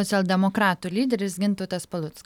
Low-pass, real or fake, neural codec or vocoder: 19.8 kHz; real; none